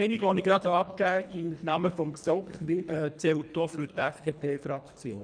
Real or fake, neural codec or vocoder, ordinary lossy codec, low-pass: fake; codec, 24 kHz, 1.5 kbps, HILCodec; none; 9.9 kHz